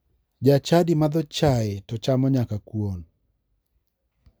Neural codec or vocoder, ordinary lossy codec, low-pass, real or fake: none; none; none; real